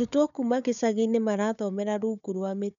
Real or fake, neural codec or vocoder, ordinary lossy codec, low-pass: fake; codec, 16 kHz, 4 kbps, FunCodec, trained on Chinese and English, 50 frames a second; none; 7.2 kHz